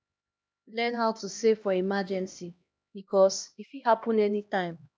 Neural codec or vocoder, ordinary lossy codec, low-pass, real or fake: codec, 16 kHz, 1 kbps, X-Codec, HuBERT features, trained on LibriSpeech; none; none; fake